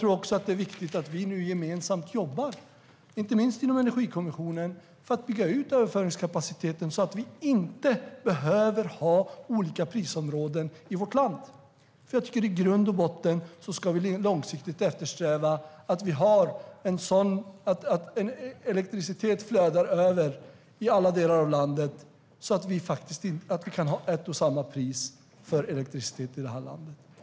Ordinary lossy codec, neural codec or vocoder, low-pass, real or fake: none; none; none; real